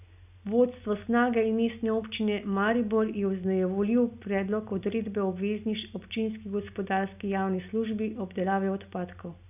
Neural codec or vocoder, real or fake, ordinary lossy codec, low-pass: none; real; none; 3.6 kHz